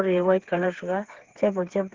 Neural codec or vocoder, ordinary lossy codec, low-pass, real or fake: codec, 16 kHz, 4 kbps, FreqCodec, smaller model; Opus, 16 kbps; 7.2 kHz; fake